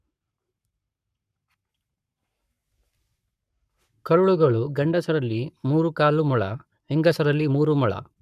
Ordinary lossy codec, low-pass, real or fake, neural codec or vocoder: none; 14.4 kHz; fake; codec, 44.1 kHz, 7.8 kbps, Pupu-Codec